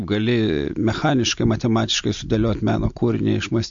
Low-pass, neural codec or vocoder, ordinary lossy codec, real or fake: 7.2 kHz; none; MP3, 48 kbps; real